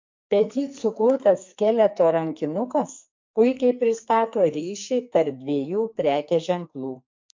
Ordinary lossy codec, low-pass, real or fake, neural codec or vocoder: MP3, 48 kbps; 7.2 kHz; fake; codec, 44.1 kHz, 2.6 kbps, SNAC